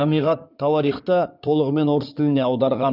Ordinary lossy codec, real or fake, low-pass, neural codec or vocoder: none; fake; 5.4 kHz; codec, 16 kHz in and 24 kHz out, 2.2 kbps, FireRedTTS-2 codec